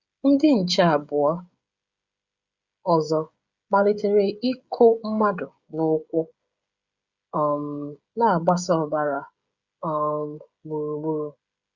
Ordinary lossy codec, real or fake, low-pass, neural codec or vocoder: Opus, 64 kbps; fake; 7.2 kHz; codec, 16 kHz, 16 kbps, FreqCodec, smaller model